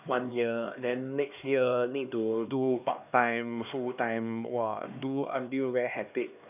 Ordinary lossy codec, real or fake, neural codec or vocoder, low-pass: none; fake; codec, 16 kHz, 2 kbps, X-Codec, HuBERT features, trained on LibriSpeech; 3.6 kHz